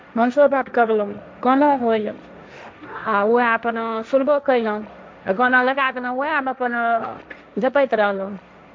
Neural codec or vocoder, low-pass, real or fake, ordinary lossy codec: codec, 16 kHz, 1.1 kbps, Voila-Tokenizer; none; fake; none